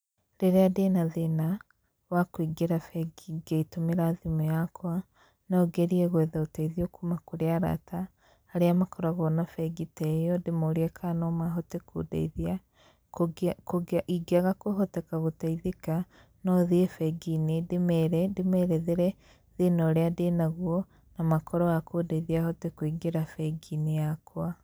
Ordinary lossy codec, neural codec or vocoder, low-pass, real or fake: none; none; none; real